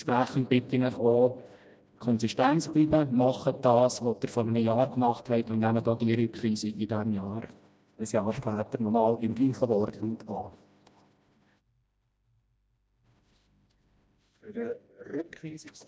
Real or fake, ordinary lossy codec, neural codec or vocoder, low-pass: fake; none; codec, 16 kHz, 1 kbps, FreqCodec, smaller model; none